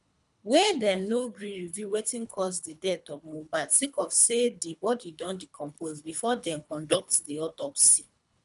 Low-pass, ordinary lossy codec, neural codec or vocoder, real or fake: 10.8 kHz; none; codec, 24 kHz, 3 kbps, HILCodec; fake